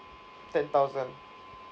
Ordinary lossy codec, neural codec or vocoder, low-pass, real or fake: none; none; none; real